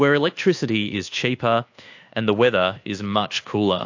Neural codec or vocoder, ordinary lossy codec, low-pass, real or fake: codec, 24 kHz, 1.2 kbps, DualCodec; AAC, 48 kbps; 7.2 kHz; fake